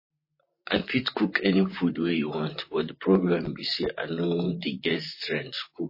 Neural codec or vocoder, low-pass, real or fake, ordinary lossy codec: vocoder, 44.1 kHz, 128 mel bands, Pupu-Vocoder; 5.4 kHz; fake; MP3, 24 kbps